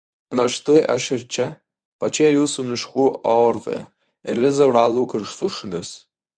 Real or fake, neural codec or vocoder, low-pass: fake; codec, 24 kHz, 0.9 kbps, WavTokenizer, medium speech release version 1; 9.9 kHz